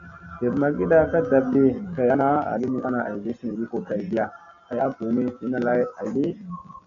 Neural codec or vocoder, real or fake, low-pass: none; real; 7.2 kHz